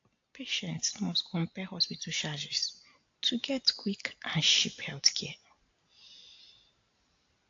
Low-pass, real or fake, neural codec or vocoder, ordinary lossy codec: 7.2 kHz; real; none; AAC, 48 kbps